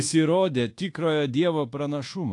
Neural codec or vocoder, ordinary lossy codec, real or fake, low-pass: codec, 24 kHz, 1.2 kbps, DualCodec; AAC, 48 kbps; fake; 10.8 kHz